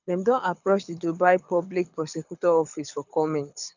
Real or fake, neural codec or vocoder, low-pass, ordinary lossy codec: fake; codec, 24 kHz, 6 kbps, HILCodec; 7.2 kHz; none